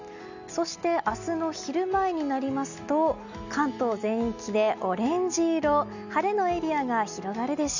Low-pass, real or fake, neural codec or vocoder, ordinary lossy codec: 7.2 kHz; real; none; none